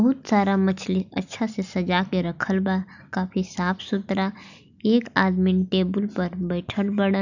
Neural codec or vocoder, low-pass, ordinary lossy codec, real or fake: none; 7.2 kHz; none; real